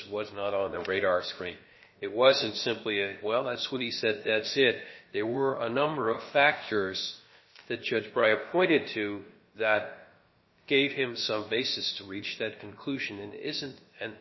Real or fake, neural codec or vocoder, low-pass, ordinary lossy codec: fake; codec, 16 kHz, about 1 kbps, DyCAST, with the encoder's durations; 7.2 kHz; MP3, 24 kbps